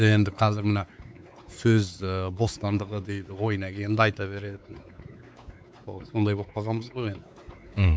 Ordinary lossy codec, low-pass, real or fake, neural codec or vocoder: none; none; fake; codec, 16 kHz, 4 kbps, X-Codec, WavLM features, trained on Multilingual LibriSpeech